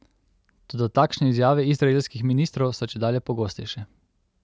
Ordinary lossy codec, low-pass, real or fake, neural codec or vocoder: none; none; real; none